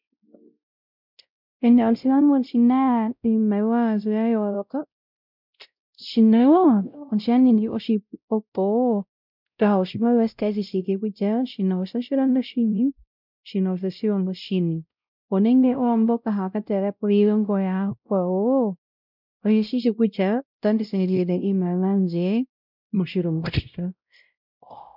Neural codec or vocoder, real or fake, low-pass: codec, 16 kHz, 0.5 kbps, X-Codec, WavLM features, trained on Multilingual LibriSpeech; fake; 5.4 kHz